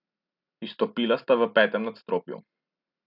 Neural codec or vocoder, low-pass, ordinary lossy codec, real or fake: none; 5.4 kHz; none; real